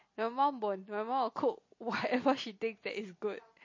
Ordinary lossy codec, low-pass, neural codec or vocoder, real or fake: MP3, 32 kbps; 7.2 kHz; none; real